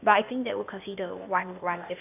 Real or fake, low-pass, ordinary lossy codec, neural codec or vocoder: fake; 3.6 kHz; none; codec, 16 kHz, 0.8 kbps, ZipCodec